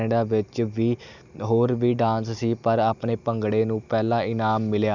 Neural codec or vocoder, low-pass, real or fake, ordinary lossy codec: none; 7.2 kHz; real; none